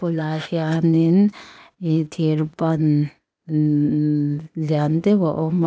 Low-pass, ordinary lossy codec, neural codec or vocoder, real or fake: none; none; codec, 16 kHz, 0.8 kbps, ZipCodec; fake